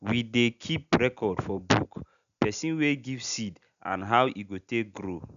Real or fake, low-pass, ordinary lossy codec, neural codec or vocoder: real; 7.2 kHz; none; none